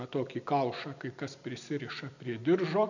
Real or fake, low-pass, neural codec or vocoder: real; 7.2 kHz; none